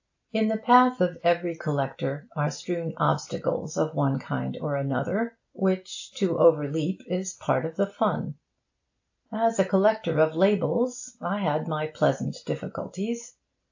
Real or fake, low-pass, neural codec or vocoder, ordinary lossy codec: real; 7.2 kHz; none; AAC, 48 kbps